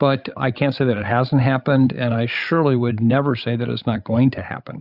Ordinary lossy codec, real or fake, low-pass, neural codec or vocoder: Opus, 64 kbps; fake; 5.4 kHz; codec, 16 kHz, 8 kbps, FreqCodec, larger model